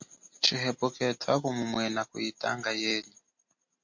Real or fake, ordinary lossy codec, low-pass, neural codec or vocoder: real; MP3, 48 kbps; 7.2 kHz; none